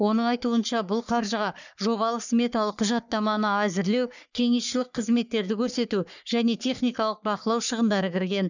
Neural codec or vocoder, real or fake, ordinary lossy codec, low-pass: codec, 44.1 kHz, 3.4 kbps, Pupu-Codec; fake; none; 7.2 kHz